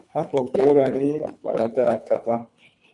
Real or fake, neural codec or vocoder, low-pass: fake; codec, 24 kHz, 3 kbps, HILCodec; 10.8 kHz